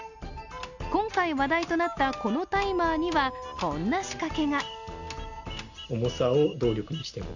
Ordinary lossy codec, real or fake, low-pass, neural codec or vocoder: none; real; 7.2 kHz; none